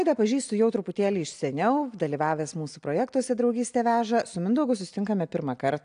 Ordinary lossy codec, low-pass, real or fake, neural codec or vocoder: AAC, 64 kbps; 9.9 kHz; real; none